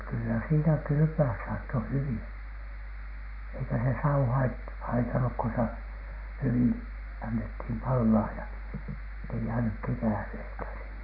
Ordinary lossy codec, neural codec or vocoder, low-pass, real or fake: none; none; 5.4 kHz; real